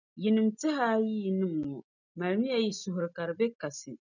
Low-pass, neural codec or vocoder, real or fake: 7.2 kHz; none; real